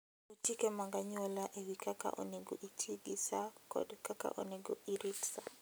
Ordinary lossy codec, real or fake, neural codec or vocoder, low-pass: none; real; none; none